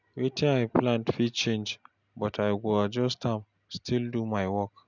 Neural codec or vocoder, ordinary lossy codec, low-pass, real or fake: none; none; 7.2 kHz; real